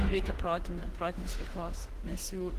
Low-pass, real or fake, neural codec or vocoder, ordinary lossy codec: 14.4 kHz; fake; autoencoder, 48 kHz, 32 numbers a frame, DAC-VAE, trained on Japanese speech; Opus, 16 kbps